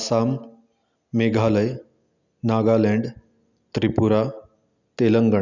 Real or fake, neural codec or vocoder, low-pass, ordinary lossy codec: real; none; 7.2 kHz; none